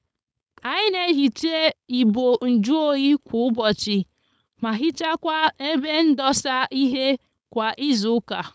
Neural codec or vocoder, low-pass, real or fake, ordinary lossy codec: codec, 16 kHz, 4.8 kbps, FACodec; none; fake; none